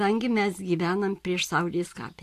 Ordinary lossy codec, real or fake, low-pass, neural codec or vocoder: AAC, 64 kbps; real; 14.4 kHz; none